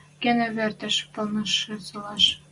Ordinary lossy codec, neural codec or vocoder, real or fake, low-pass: Opus, 64 kbps; none; real; 10.8 kHz